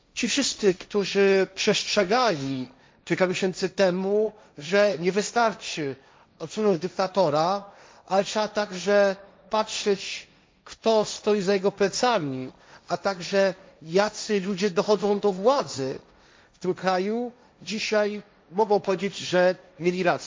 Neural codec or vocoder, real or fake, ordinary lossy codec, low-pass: codec, 16 kHz, 1.1 kbps, Voila-Tokenizer; fake; none; none